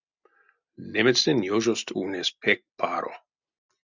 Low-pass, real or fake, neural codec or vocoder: 7.2 kHz; real; none